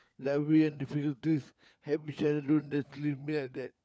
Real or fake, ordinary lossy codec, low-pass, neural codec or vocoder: fake; none; none; codec, 16 kHz, 4 kbps, FunCodec, trained on LibriTTS, 50 frames a second